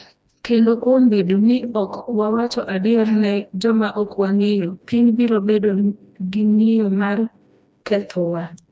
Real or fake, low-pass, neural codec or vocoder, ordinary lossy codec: fake; none; codec, 16 kHz, 1 kbps, FreqCodec, smaller model; none